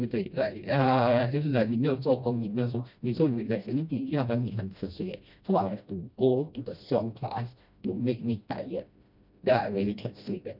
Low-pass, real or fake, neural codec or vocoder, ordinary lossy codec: 5.4 kHz; fake; codec, 16 kHz, 1 kbps, FreqCodec, smaller model; none